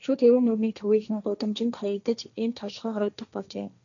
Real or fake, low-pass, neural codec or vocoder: fake; 7.2 kHz; codec, 16 kHz, 1.1 kbps, Voila-Tokenizer